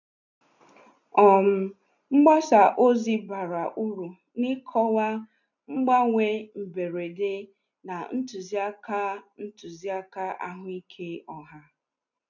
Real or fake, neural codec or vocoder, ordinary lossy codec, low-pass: real; none; none; 7.2 kHz